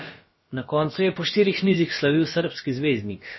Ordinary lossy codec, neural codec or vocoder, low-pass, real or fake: MP3, 24 kbps; codec, 16 kHz, about 1 kbps, DyCAST, with the encoder's durations; 7.2 kHz; fake